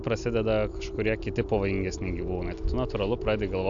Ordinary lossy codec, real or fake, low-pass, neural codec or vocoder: AAC, 64 kbps; real; 7.2 kHz; none